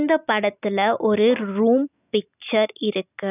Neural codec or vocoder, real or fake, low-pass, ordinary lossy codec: none; real; 3.6 kHz; none